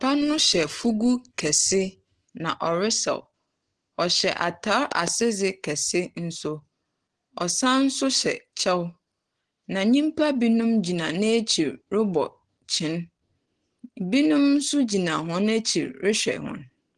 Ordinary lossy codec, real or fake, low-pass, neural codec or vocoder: Opus, 16 kbps; real; 9.9 kHz; none